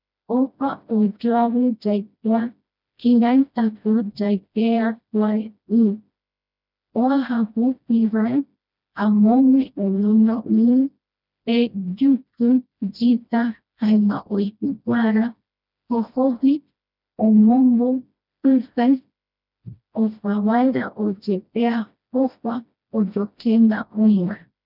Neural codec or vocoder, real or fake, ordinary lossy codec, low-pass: codec, 16 kHz, 1 kbps, FreqCodec, smaller model; fake; none; 5.4 kHz